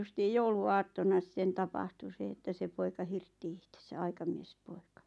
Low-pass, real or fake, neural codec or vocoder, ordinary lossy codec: none; real; none; none